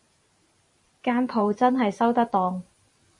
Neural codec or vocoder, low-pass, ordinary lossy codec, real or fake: vocoder, 44.1 kHz, 128 mel bands every 512 samples, BigVGAN v2; 10.8 kHz; MP3, 48 kbps; fake